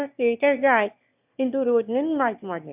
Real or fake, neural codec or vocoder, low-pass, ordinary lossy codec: fake; autoencoder, 22.05 kHz, a latent of 192 numbers a frame, VITS, trained on one speaker; 3.6 kHz; AAC, 32 kbps